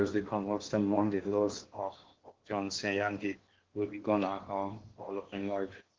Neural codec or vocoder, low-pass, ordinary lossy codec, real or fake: codec, 16 kHz in and 24 kHz out, 0.8 kbps, FocalCodec, streaming, 65536 codes; 7.2 kHz; Opus, 16 kbps; fake